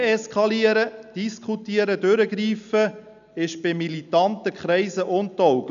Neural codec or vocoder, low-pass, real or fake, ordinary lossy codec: none; 7.2 kHz; real; none